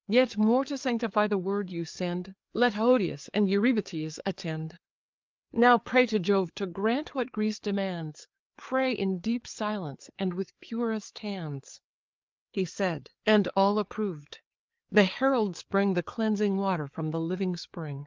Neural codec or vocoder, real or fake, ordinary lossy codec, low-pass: codec, 16 kHz, 4 kbps, FreqCodec, larger model; fake; Opus, 24 kbps; 7.2 kHz